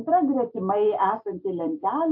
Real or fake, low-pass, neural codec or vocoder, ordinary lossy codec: real; 5.4 kHz; none; MP3, 32 kbps